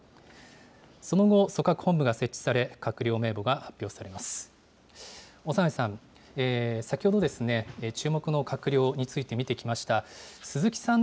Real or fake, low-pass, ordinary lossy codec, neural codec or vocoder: real; none; none; none